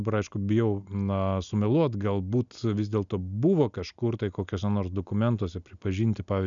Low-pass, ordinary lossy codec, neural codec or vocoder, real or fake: 7.2 kHz; MP3, 96 kbps; none; real